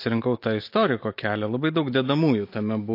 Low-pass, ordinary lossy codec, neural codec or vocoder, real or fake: 5.4 kHz; MP3, 32 kbps; none; real